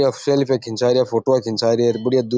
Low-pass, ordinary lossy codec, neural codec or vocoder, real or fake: none; none; none; real